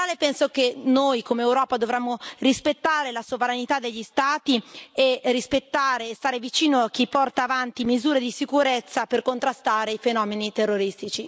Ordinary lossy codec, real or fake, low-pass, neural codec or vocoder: none; real; none; none